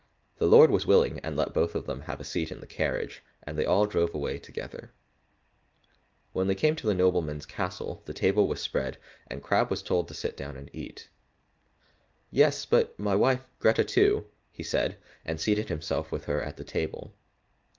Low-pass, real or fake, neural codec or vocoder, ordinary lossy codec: 7.2 kHz; real; none; Opus, 24 kbps